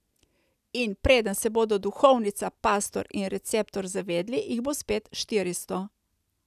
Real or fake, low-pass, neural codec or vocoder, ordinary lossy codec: real; 14.4 kHz; none; none